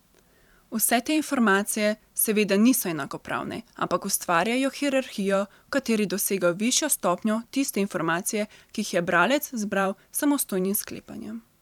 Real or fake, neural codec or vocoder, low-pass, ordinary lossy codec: fake; vocoder, 44.1 kHz, 128 mel bands every 512 samples, BigVGAN v2; 19.8 kHz; none